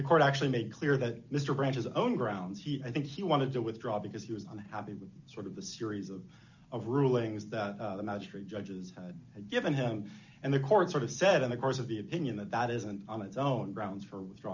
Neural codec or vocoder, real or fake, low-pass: none; real; 7.2 kHz